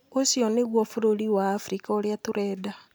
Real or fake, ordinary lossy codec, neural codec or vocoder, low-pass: real; none; none; none